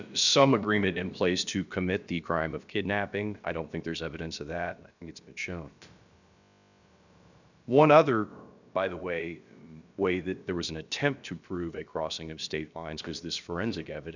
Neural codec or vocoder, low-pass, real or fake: codec, 16 kHz, about 1 kbps, DyCAST, with the encoder's durations; 7.2 kHz; fake